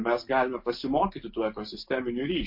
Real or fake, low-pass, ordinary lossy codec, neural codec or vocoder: real; 5.4 kHz; MP3, 32 kbps; none